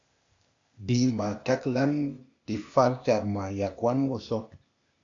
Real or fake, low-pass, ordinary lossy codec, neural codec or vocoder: fake; 7.2 kHz; AAC, 48 kbps; codec, 16 kHz, 0.8 kbps, ZipCodec